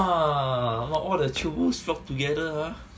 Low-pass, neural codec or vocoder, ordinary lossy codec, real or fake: none; none; none; real